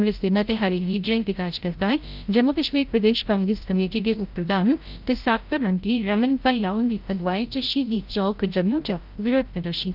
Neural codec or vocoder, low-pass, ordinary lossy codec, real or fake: codec, 16 kHz, 0.5 kbps, FreqCodec, larger model; 5.4 kHz; Opus, 24 kbps; fake